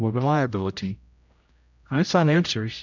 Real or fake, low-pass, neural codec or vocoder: fake; 7.2 kHz; codec, 16 kHz, 0.5 kbps, X-Codec, HuBERT features, trained on general audio